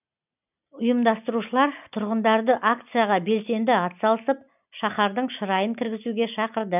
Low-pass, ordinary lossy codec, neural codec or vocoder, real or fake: 3.6 kHz; none; none; real